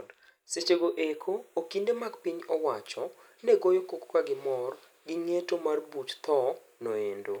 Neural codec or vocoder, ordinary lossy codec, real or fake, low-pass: none; none; real; none